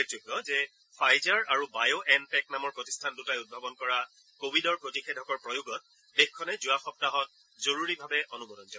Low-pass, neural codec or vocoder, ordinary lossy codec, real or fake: none; none; none; real